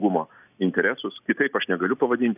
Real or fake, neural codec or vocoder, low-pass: real; none; 3.6 kHz